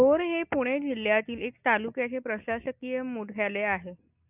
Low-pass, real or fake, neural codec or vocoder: 3.6 kHz; real; none